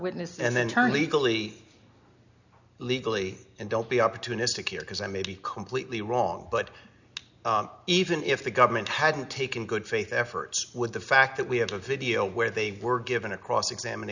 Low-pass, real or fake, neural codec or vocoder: 7.2 kHz; real; none